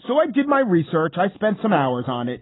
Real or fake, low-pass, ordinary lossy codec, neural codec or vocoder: real; 7.2 kHz; AAC, 16 kbps; none